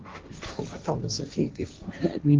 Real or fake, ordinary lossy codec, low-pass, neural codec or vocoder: fake; Opus, 24 kbps; 7.2 kHz; codec, 16 kHz, 1.1 kbps, Voila-Tokenizer